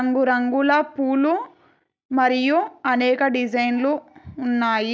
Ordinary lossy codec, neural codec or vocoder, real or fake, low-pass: none; none; real; none